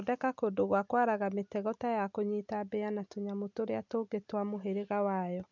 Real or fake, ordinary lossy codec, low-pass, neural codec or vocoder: real; none; 7.2 kHz; none